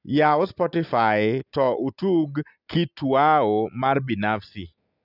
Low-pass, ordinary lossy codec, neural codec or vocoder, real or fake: 5.4 kHz; none; none; real